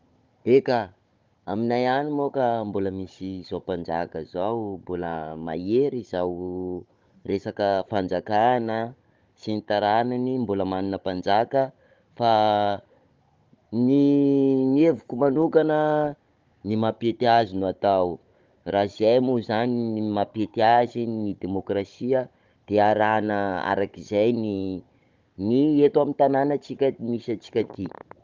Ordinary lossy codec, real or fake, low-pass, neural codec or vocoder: Opus, 32 kbps; fake; 7.2 kHz; codec, 16 kHz, 16 kbps, FunCodec, trained on Chinese and English, 50 frames a second